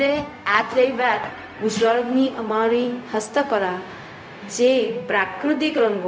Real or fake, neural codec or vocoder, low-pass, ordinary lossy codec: fake; codec, 16 kHz, 0.4 kbps, LongCat-Audio-Codec; none; none